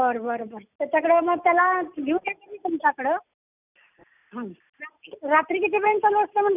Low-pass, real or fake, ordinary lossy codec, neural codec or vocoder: 3.6 kHz; real; none; none